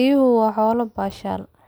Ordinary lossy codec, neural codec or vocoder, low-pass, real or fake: none; none; none; real